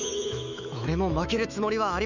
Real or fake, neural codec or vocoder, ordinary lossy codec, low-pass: fake; autoencoder, 48 kHz, 128 numbers a frame, DAC-VAE, trained on Japanese speech; none; 7.2 kHz